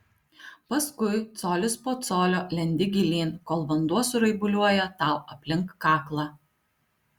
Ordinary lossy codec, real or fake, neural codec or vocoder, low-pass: Opus, 64 kbps; real; none; 19.8 kHz